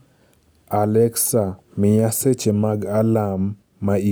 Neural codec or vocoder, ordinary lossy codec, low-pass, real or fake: none; none; none; real